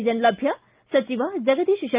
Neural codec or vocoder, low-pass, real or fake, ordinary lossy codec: none; 3.6 kHz; real; Opus, 32 kbps